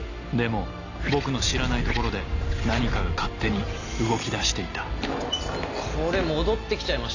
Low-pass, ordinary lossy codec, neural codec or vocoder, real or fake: 7.2 kHz; none; none; real